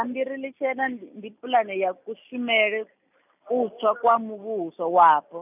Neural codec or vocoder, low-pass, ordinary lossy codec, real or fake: none; 3.6 kHz; none; real